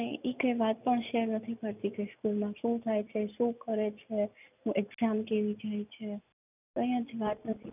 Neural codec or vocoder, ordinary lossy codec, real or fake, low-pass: none; none; real; 3.6 kHz